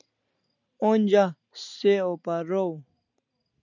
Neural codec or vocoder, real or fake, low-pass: none; real; 7.2 kHz